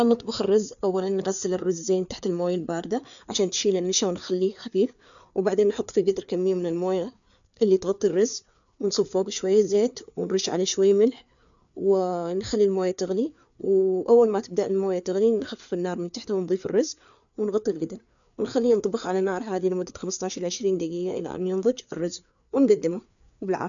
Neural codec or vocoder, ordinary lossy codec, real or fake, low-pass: codec, 16 kHz, 4 kbps, FreqCodec, larger model; none; fake; 7.2 kHz